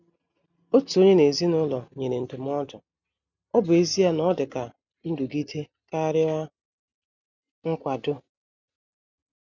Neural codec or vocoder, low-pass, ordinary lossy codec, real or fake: none; 7.2 kHz; none; real